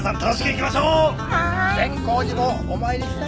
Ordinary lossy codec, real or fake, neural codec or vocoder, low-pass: none; real; none; none